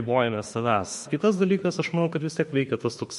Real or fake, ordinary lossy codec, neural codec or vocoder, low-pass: fake; MP3, 48 kbps; autoencoder, 48 kHz, 32 numbers a frame, DAC-VAE, trained on Japanese speech; 14.4 kHz